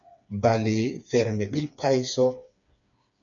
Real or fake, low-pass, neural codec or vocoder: fake; 7.2 kHz; codec, 16 kHz, 4 kbps, FreqCodec, smaller model